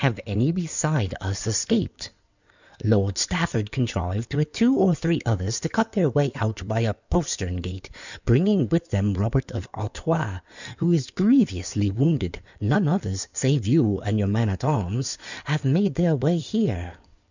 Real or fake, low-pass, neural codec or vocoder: fake; 7.2 kHz; codec, 16 kHz in and 24 kHz out, 2.2 kbps, FireRedTTS-2 codec